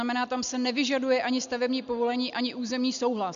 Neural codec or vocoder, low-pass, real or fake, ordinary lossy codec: none; 7.2 kHz; real; MP3, 64 kbps